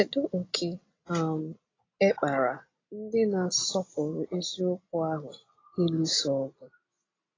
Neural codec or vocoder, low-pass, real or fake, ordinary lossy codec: none; 7.2 kHz; real; AAC, 32 kbps